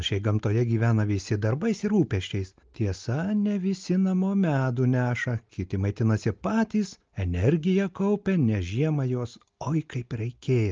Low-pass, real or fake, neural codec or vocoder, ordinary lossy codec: 7.2 kHz; real; none; Opus, 24 kbps